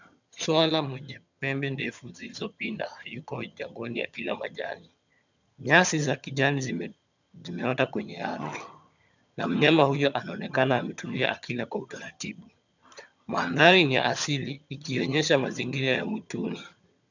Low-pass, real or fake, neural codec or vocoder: 7.2 kHz; fake; vocoder, 22.05 kHz, 80 mel bands, HiFi-GAN